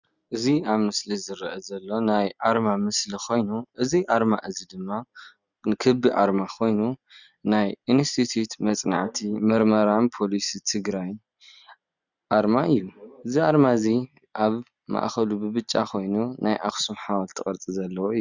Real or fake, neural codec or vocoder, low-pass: real; none; 7.2 kHz